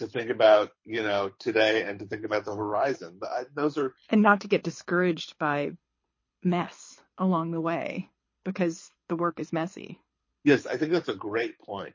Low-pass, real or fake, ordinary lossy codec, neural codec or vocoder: 7.2 kHz; fake; MP3, 32 kbps; codec, 24 kHz, 6 kbps, HILCodec